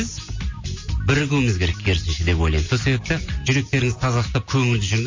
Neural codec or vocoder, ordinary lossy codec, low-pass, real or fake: none; MP3, 32 kbps; 7.2 kHz; real